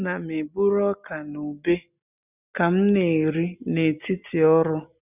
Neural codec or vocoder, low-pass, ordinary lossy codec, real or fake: none; 3.6 kHz; none; real